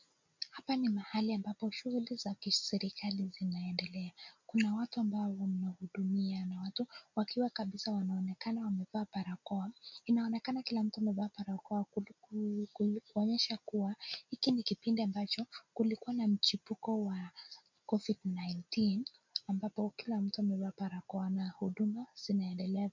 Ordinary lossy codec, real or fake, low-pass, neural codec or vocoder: MP3, 64 kbps; real; 7.2 kHz; none